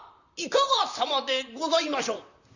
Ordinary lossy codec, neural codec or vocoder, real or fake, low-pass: none; vocoder, 44.1 kHz, 128 mel bands, Pupu-Vocoder; fake; 7.2 kHz